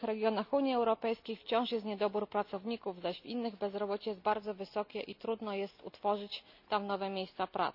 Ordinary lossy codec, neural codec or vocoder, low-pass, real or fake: none; none; 5.4 kHz; real